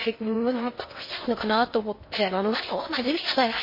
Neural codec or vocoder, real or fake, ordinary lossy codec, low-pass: codec, 16 kHz in and 24 kHz out, 0.6 kbps, FocalCodec, streaming, 2048 codes; fake; MP3, 24 kbps; 5.4 kHz